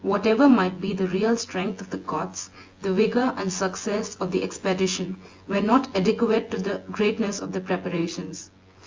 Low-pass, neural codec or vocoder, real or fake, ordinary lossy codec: 7.2 kHz; vocoder, 24 kHz, 100 mel bands, Vocos; fake; Opus, 32 kbps